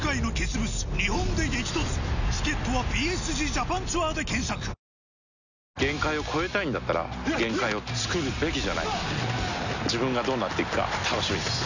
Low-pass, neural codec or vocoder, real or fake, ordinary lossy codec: 7.2 kHz; none; real; none